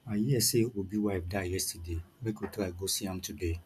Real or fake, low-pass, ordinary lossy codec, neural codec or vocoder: real; 14.4 kHz; none; none